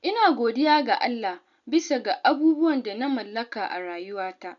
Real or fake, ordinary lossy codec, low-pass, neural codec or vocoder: real; none; 7.2 kHz; none